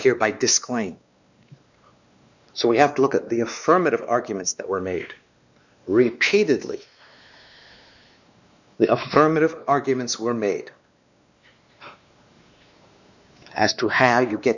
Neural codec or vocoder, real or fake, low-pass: codec, 16 kHz, 2 kbps, X-Codec, WavLM features, trained on Multilingual LibriSpeech; fake; 7.2 kHz